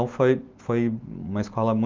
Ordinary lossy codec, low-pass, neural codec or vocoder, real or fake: Opus, 24 kbps; 7.2 kHz; none; real